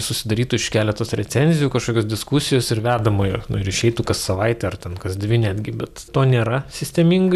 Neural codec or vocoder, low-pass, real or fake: none; 14.4 kHz; real